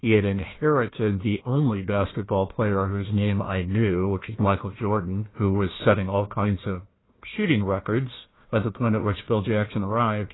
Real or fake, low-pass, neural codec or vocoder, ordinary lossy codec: fake; 7.2 kHz; codec, 16 kHz, 1 kbps, FunCodec, trained on Chinese and English, 50 frames a second; AAC, 16 kbps